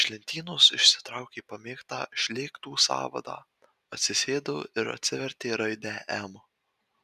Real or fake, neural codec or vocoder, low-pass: real; none; 14.4 kHz